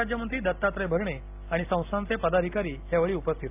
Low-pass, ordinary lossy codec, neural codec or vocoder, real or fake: 3.6 kHz; AAC, 32 kbps; none; real